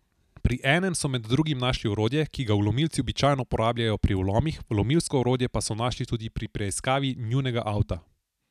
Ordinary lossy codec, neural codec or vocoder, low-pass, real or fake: none; none; 14.4 kHz; real